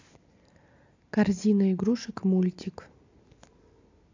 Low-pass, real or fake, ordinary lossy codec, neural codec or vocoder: 7.2 kHz; real; AAC, 48 kbps; none